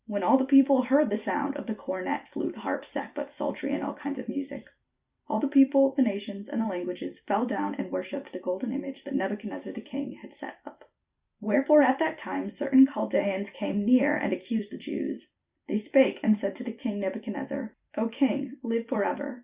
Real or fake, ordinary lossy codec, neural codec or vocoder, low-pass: fake; Opus, 64 kbps; vocoder, 44.1 kHz, 128 mel bands every 256 samples, BigVGAN v2; 3.6 kHz